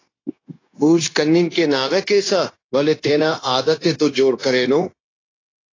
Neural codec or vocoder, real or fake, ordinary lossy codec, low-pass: codec, 16 kHz, 0.9 kbps, LongCat-Audio-Codec; fake; AAC, 32 kbps; 7.2 kHz